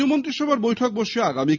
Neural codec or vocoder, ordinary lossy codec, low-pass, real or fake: none; none; 7.2 kHz; real